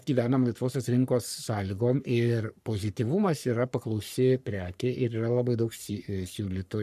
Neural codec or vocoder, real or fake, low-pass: codec, 44.1 kHz, 3.4 kbps, Pupu-Codec; fake; 14.4 kHz